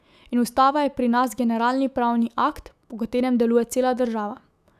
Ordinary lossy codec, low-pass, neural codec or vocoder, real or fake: none; 14.4 kHz; autoencoder, 48 kHz, 128 numbers a frame, DAC-VAE, trained on Japanese speech; fake